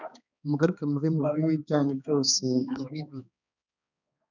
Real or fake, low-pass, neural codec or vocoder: fake; 7.2 kHz; codec, 16 kHz, 2 kbps, X-Codec, HuBERT features, trained on balanced general audio